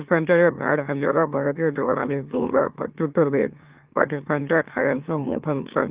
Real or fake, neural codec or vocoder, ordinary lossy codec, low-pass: fake; autoencoder, 44.1 kHz, a latent of 192 numbers a frame, MeloTTS; Opus, 32 kbps; 3.6 kHz